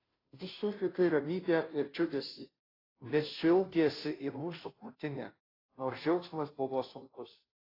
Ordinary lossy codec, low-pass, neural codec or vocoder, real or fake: AAC, 24 kbps; 5.4 kHz; codec, 16 kHz, 0.5 kbps, FunCodec, trained on Chinese and English, 25 frames a second; fake